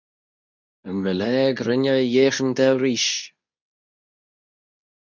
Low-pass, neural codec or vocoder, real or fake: 7.2 kHz; codec, 24 kHz, 0.9 kbps, WavTokenizer, medium speech release version 2; fake